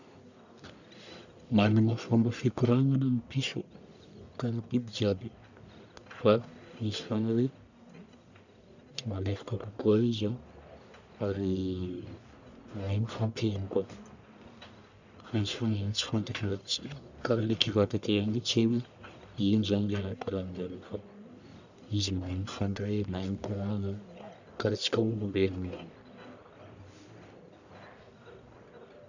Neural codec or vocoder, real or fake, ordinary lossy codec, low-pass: codec, 44.1 kHz, 1.7 kbps, Pupu-Codec; fake; none; 7.2 kHz